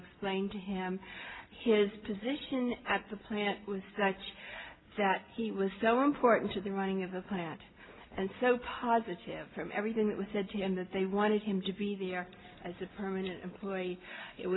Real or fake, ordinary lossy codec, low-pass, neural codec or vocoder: real; AAC, 16 kbps; 7.2 kHz; none